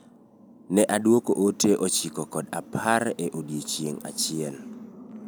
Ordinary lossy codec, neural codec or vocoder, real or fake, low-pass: none; none; real; none